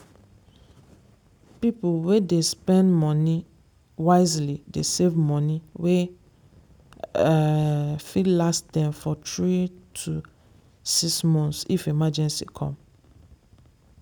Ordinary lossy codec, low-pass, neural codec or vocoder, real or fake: none; none; none; real